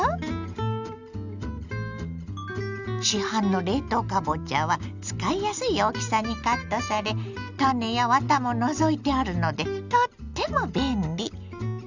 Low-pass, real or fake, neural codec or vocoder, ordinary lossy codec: 7.2 kHz; real; none; none